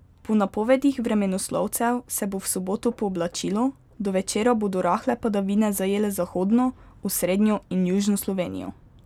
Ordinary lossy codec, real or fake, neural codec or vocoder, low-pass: none; real; none; 19.8 kHz